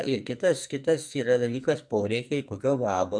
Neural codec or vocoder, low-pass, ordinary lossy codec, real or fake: codec, 44.1 kHz, 2.6 kbps, SNAC; 9.9 kHz; MP3, 96 kbps; fake